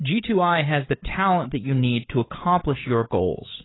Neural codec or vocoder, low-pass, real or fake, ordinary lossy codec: codec, 16 kHz, 8 kbps, FreqCodec, larger model; 7.2 kHz; fake; AAC, 16 kbps